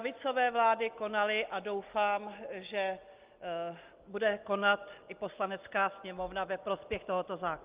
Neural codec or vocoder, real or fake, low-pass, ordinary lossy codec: none; real; 3.6 kHz; Opus, 24 kbps